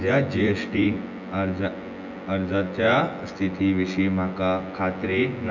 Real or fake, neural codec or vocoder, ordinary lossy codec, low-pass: fake; vocoder, 24 kHz, 100 mel bands, Vocos; none; 7.2 kHz